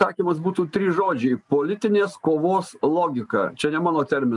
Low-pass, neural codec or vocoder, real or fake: 10.8 kHz; none; real